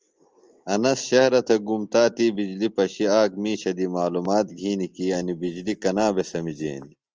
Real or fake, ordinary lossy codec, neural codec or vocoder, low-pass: real; Opus, 24 kbps; none; 7.2 kHz